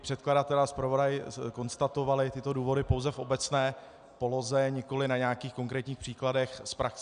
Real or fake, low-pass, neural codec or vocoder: real; 9.9 kHz; none